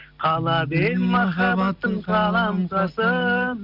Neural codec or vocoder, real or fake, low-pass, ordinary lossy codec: none; real; 5.4 kHz; none